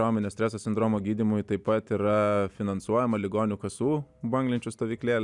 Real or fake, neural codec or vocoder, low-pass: real; none; 10.8 kHz